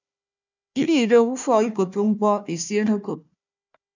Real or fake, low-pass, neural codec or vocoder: fake; 7.2 kHz; codec, 16 kHz, 1 kbps, FunCodec, trained on Chinese and English, 50 frames a second